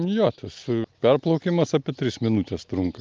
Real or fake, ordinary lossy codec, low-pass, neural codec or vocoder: real; Opus, 24 kbps; 7.2 kHz; none